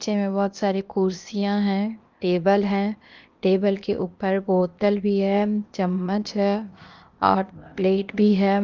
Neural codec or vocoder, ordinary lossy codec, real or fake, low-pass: codec, 16 kHz, 0.8 kbps, ZipCodec; Opus, 32 kbps; fake; 7.2 kHz